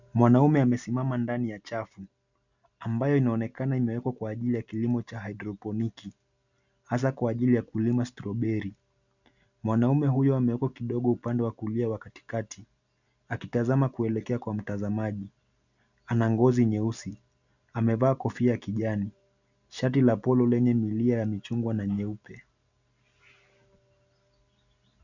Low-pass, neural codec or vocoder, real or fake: 7.2 kHz; none; real